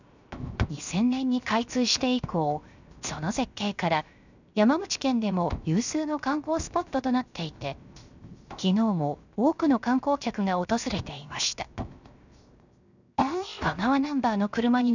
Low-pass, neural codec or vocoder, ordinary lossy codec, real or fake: 7.2 kHz; codec, 16 kHz, 0.7 kbps, FocalCodec; none; fake